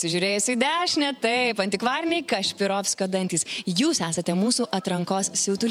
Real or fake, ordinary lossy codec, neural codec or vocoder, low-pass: fake; MP3, 96 kbps; vocoder, 48 kHz, 128 mel bands, Vocos; 19.8 kHz